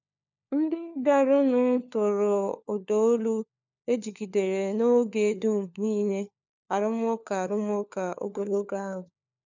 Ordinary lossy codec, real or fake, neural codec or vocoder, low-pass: none; fake; codec, 16 kHz, 4 kbps, FunCodec, trained on LibriTTS, 50 frames a second; 7.2 kHz